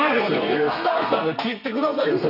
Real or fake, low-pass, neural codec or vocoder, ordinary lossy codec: fake; 5.4 kHz; codec, 32 kHz, 1.9 kbps, SNAC; none